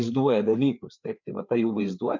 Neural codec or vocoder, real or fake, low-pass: codec, 16 kHz, 4 kbps, FreqCodec, larger model; fake; 7.2 kHz